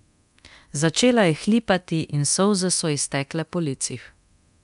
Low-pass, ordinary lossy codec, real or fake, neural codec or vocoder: 10.8 kHz; none; fake; codec, 24 kHz, 0.9 kbps, DualCodec